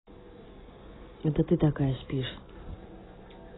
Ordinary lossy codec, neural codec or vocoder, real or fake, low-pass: AAC, 16 kbps; none; real; 7.2 kHz